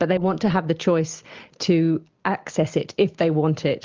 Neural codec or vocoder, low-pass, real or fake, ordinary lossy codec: none; 7.2 kHz; real; Opus, 24 kbps